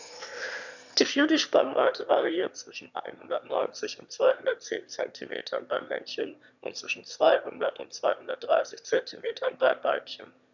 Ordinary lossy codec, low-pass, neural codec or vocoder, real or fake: none; 7.2 kHz; autoencoder, 22.05 kHz, a latent of 192 numbers a frame, VITS, trained on one speaker; fake